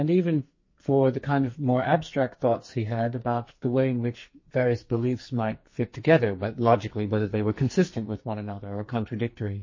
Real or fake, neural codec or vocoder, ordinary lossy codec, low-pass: fake; codec, 44.1 kHz, 2.6 kbps, SNAC; MP3, 32 kbps; 7.2 kHz